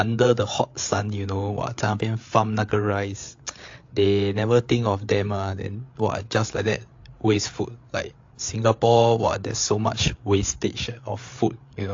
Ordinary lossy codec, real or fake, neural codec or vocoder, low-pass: AAC, 48 kbps; fake; codec, 16 kHz, 8 kbps, FreqCodec, larger model; 7.2 kHz